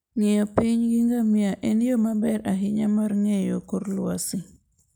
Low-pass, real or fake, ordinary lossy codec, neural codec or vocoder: none; real; none; none